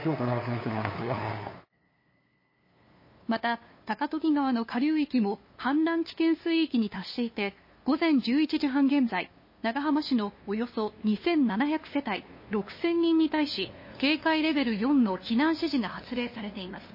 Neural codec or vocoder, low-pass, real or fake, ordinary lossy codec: codec, 16 kHz, 2 kbps, FunCodec, trained on LibriTTS, 25 frames a second; 5.4 kHz; fake; MP3, 24 kbps